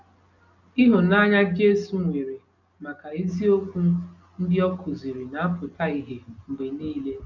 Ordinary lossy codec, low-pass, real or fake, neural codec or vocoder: none; 7.2 kHz; real; none